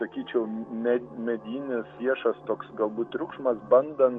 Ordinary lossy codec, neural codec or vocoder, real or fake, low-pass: MP3, 48 kbps; none; real; 9.9 kHz